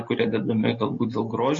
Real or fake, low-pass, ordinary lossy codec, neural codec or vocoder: real; 7.2 kHz; MP3, 32 kbps; none